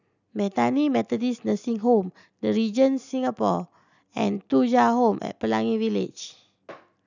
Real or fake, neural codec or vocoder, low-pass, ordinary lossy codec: real; none; 7.2 kHz; none